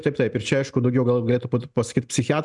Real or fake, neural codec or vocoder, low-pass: real; none; 10.8 kHz